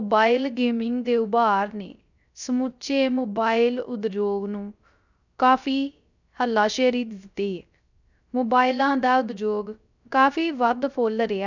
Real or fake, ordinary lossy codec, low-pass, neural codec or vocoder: fake; none; 7.2 kHz; codec, 16 kHz, 0.3 kbps, FocalCodec